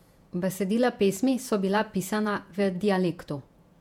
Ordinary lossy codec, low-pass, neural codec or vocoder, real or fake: MP3, 96 kbps; 19.8 kHz; vocoder, 48 kHz, 128 mel bands, Vocos; fake